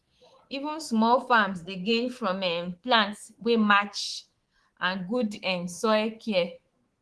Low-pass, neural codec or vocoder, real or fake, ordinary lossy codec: 10.8 kHz; codec, 24 kHz, 3.1 kbps, DualCodec; fake; Opus, 16 kbps